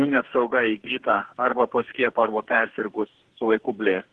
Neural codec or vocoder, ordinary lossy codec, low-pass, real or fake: codec, 44.1 kHz, 2.6 kbps, SNAC; Opus, 16 kbps; 10.8 kHz; fake